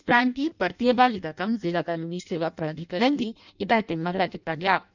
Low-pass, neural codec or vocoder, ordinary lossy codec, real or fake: 7.2 kHz; codec, 16 kHz in and 24 kHz out, 0.6 kbps, FireRedTTS-2 codec; none; fake